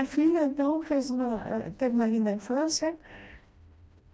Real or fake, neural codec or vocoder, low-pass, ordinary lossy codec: fake; codec, 16 kHz, 1 kbps, FreqCodec, smaller model; none; none